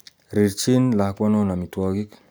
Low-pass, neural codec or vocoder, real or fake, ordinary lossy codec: none; none; real; none